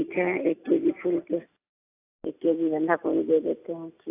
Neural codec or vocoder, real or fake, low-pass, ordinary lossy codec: none; real; 3.6 kHz; AAC, 24 kbps